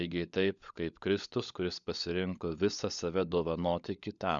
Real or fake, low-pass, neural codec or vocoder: fake; 7.2 kHz; codec, 16 kHz, 16 kbps, FunCodec, trained on LibriTTS, 50 frames a second